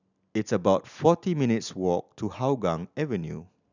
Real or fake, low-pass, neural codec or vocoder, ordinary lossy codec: real; 7.2 kHz; none; none